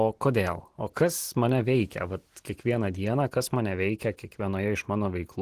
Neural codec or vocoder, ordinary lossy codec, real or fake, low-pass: none; Opus, 16 kbps; real; 14.4 kHz